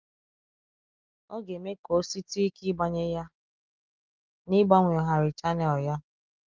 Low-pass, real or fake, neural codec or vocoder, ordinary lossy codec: 7.2 kHz; real; none; Opus, 32 kbps